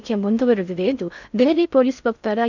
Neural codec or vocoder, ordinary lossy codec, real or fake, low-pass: codec, 16 kHz in and 24 kHz out, 0.6 kbps, FocalCodec, streaming, 4096 codes; none; fake; 7.2 kHz